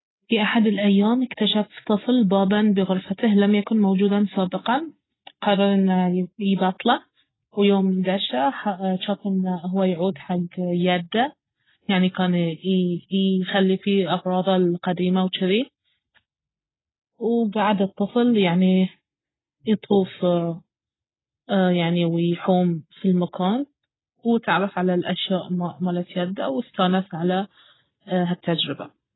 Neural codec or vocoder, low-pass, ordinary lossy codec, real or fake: none; 7.2 kHz; AAC, 16 kbps; real